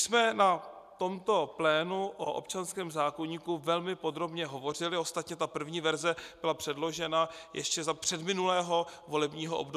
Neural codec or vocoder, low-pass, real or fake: none; 14.4 kHz; real